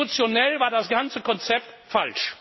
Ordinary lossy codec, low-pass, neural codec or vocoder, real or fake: MP3, 24 kbps; 7.2 kHz; none; real